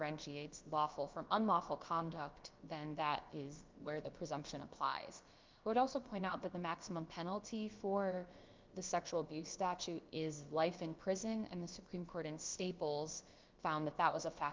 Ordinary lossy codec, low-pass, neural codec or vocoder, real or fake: Opus, 24 kbps; 7.2 kHz; codec, 16 kHz, about 1 kbps, DyCAST, with the encoder's durations; fake